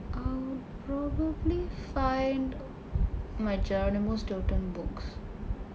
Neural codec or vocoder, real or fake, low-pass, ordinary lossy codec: none; real; none; none